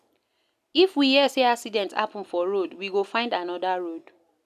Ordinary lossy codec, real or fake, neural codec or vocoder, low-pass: none; real; none; 14.4 kHz